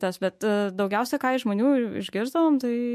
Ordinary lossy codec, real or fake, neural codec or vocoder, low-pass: MP3, 64 kbps; fake; autoencoder, 48 kHz, 128 numbers a frame, DAC-VAE, trained on Japanese speech; 14.4 kHz